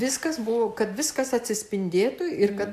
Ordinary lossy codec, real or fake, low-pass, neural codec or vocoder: AAC, 96 kbps; real; 14.4 kHz; none